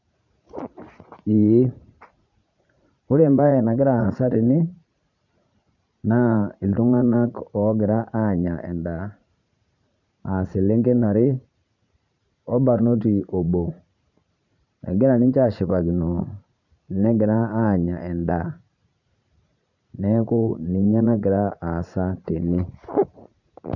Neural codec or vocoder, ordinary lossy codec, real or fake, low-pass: vocoder, 44.1 kHz, 80 mel bands, Vocos; none; fake; 7.2 kHz